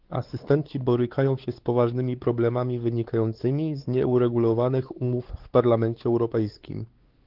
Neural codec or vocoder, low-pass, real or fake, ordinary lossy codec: codec, 16 kHz, 4 kbps, X-Codec, WavLM features, trained on Multilingual LibriSpeech; 5.4 kHz; fake; Opus, 16 kbps